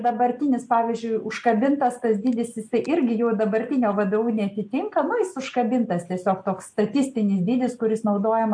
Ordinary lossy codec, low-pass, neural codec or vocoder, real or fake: MP3, 64 kbps; 9.9 kHz; none; real